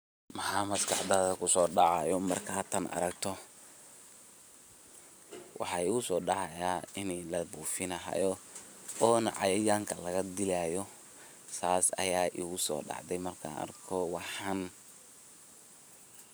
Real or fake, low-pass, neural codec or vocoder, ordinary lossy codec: fake; none; vocoder, 44.1 kHz, 128 mel bands every 512 samples, BigVGAN v2; none